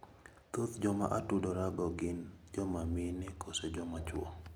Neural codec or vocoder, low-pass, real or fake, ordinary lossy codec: none; none; real; none